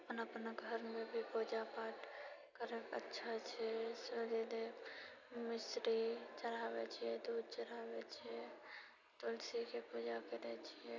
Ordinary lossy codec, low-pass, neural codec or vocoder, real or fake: none; 7.2 kHz; none; real